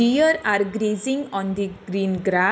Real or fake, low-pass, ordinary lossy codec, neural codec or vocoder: real; none; none; none